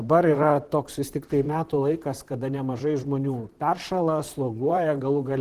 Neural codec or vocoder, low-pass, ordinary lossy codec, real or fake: vocoder, 44.1 kHz, 128 mel bands, Pupu-Vocoder; 14.4 kHz; Opus, 16 kbps; fake